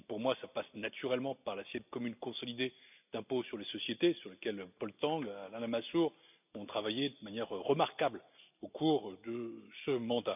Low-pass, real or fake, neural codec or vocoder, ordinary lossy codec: 3.6 kHz; real; none; none